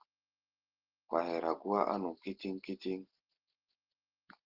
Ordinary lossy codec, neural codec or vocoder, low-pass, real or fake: Opus, 16 kbps; none; 5.4 kHz; real